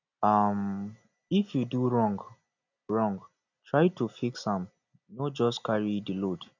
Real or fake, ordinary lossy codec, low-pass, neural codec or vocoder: real; none; 7.2 kHz; none